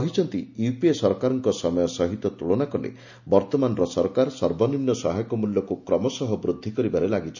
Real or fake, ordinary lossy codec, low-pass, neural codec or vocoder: real; none; 7.2 kHz; none